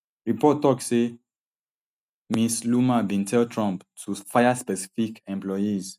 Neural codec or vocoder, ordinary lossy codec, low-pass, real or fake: none; none; 14.4 kHz; real